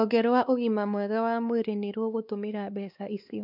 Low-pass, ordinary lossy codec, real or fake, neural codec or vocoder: 5.4 kHz; none; fake; codec, 16 kHz, 2 kbps, X-Codec, WavLM features, trained on Multilingual LibriSpeech